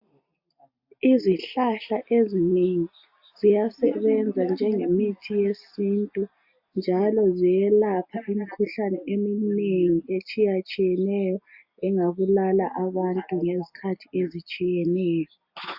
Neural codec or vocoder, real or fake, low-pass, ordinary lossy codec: none; real; 5.4 kHz; AAC, 48 kbps